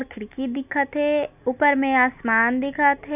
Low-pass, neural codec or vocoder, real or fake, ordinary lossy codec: 3.6 kHz; none; real; none